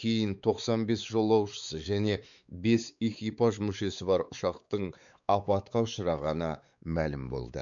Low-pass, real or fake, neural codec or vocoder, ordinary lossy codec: 7.2 kHz; fake; codec, 16 kHz, 4 kbps, X-Codec, WavLM features, trained on Multilingual LibriSpeech; none